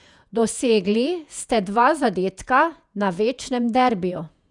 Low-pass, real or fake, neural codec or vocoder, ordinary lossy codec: 10.8 kHz; fake; vocoder, 48 kHz, 128 mel bands, Vocos; none